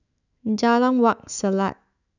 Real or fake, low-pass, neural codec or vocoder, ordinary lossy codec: fake; 7.2 kHz; autoencoder, 48 kHz, 128 numbers a frame, DAC-VAE, trained on Japanese speech; none